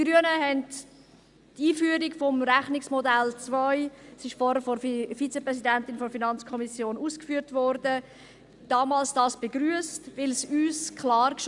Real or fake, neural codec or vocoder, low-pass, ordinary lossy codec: real; none; 10.8 kHz; Opus, 64 kbps